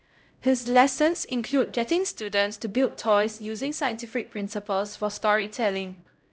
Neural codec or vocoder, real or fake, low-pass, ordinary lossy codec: codec, 16 kHz, 0.5 kbps, X-Codec, HuBERT features, trained on LibriSpeech; fake; none; none